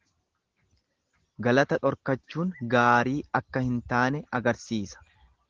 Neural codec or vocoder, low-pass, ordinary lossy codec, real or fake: none; 7.2 kHz; Opus, 16 kbps; real